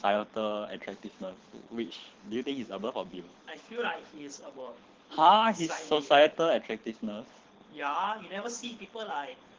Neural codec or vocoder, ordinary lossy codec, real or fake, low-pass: codec, 24 kHz, 6 kbps, HILCodec; Opus, 16 kbps; fake; 7.2 kHz